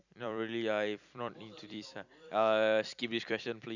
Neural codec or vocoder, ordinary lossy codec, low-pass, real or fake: vocoder, 44.1 kHz, 128 mel bands every 256 samples, BigVGAN v2; none; 7.2 kHz; fake